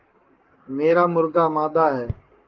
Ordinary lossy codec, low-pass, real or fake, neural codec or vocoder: Opus, 24 kbps; 7.2 kHz; fake; codec, 44.1 kHz, 7.8 kbps, Pupu-Codec